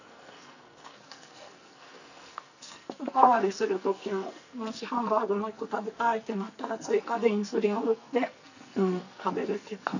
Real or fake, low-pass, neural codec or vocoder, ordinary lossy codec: fake; 7.2 kHz; codec, 44.1 kHz, 2.6 kbps, SNAC; none